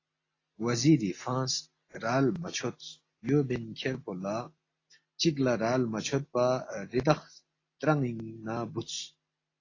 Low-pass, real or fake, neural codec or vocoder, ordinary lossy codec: 7.2 kHz; real; none; AAC, 32 kbps